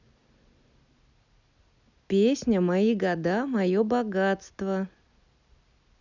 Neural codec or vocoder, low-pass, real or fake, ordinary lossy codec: none; 7.2 kHz; real; none